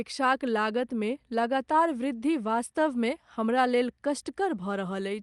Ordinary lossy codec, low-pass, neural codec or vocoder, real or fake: Opus, 32 kbps; 10.8 kHz; none; real